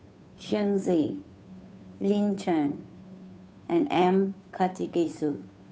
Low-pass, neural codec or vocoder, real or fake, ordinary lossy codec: none; codec, 16 kHz, 2 kbps, FunCodec, trained on Chinese and English, 25 frames a second; fake; none